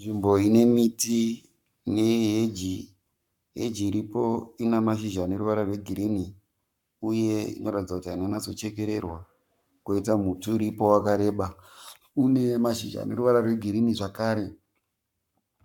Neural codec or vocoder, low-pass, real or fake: codec, 44.1 kHz, 7.8 kbps, Pupu-Codec; 19.8 kHz; fake